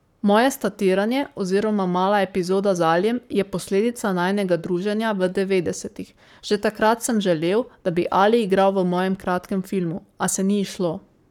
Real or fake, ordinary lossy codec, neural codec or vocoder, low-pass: fake; none; codec, 44.1 kHz, 7.8 kbps, Pupu-Codec; 19.8 kHz